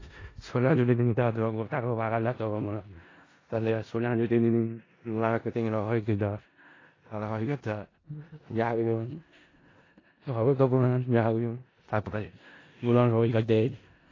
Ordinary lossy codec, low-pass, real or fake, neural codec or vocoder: AAC, 32 kbps; 7.2 kHz; fake; codec, 16 kHz in and 24 kHz out, 0.4 kbps, LongCat-Audio-Codec, four codebook decoder